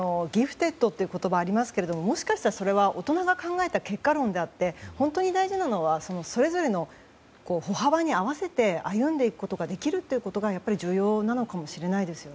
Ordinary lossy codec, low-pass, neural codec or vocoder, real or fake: none; none; none; real